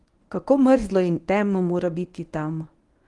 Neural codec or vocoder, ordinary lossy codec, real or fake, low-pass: codec, 24 kHz, 0.9 kbps, WavTokenizer, medium speech release version 1; Opus, 24 kbps; fake; 10.8 kHz